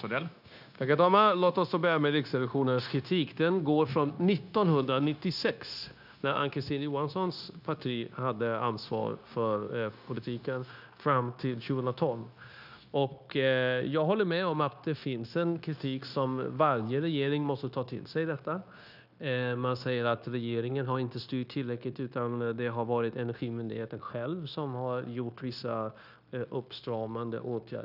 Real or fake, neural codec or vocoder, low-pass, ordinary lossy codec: fake; codec, 16 kHz, 0.9 kbps, LongCat-Audio-Codec; 5.4 kHz; none